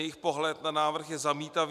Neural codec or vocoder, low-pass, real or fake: none; 14.4 kHz; real